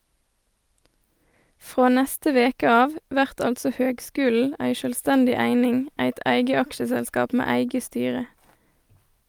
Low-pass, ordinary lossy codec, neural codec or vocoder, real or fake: 19.8 kHz; Opus, 32 kbps; none; real